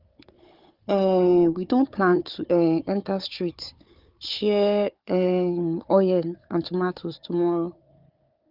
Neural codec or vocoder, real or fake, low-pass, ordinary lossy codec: codec, 16 kHz, 4 kbps, FunCodec, trained on Chinese and English, 50 frames a second; fake; 5.4 kHz; Opus, 24 kbps